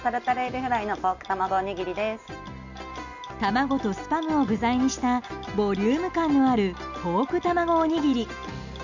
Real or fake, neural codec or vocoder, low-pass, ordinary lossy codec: real; none; 7.2 kHz; none